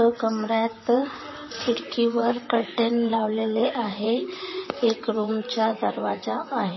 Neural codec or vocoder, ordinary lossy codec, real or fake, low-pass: vocoder, 44.1 kHz, 128 mel bands, Pupu-Vocoder; MP3, 24 kbps; fake; 7.2 kHz